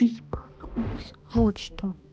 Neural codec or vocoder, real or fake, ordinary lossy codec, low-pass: codec, 16 kHz, 1 kbps, X-Codec, HuBERT features, trained on balanced general audio; fake; none; none